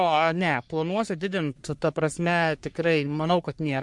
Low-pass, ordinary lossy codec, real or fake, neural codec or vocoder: 10.8 kHz; MP3, 64 kbps; fake; codec, 44.1 kHz, 3.4 kbps, Pupu-Codec